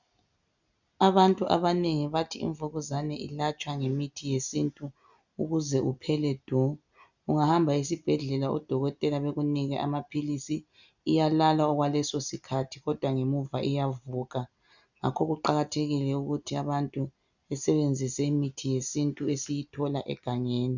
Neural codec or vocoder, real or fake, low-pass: none; real; 7.2 kHz